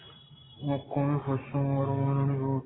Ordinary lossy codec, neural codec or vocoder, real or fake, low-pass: AAC, 16 kbps; none; real; 7.2 kHz